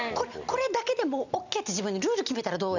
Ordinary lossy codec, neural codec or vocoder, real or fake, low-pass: none; none; real; 7.2 kHz